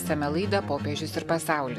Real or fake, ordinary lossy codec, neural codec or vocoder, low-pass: real; AAC, 96 kbps; none; 14.4 kHz